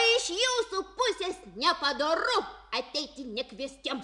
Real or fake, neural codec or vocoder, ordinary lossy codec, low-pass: real; none; MP3, 96 kbps; 10.8 kHz